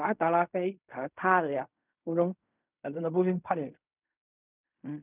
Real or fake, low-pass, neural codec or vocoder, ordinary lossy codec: fake; 3.6 kHz; codec, 16 kHz in and 24 kHz out, 0.4 kbps, LongCat-Audio-Codec, fine tuned four codebook decoder; none